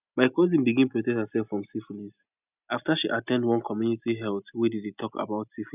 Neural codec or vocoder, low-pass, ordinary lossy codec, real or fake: none; 3.6 kHz; none; real